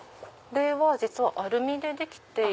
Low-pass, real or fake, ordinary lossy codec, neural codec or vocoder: none; real; none; none